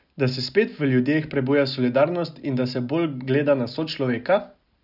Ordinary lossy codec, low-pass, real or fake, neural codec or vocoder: none; 5.4 kHz; real; none